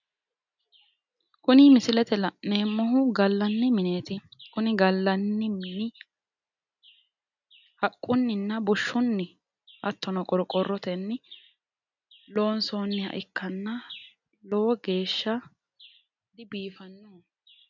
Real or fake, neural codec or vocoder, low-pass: real; none; 7.2 kHz